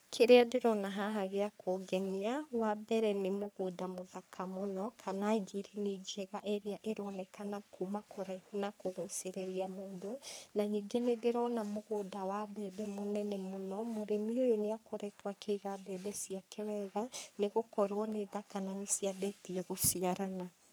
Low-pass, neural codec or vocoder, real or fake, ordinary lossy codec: none; codec, 44.1 kHz, 3.4 kbps, Pupu-Codec; fake; none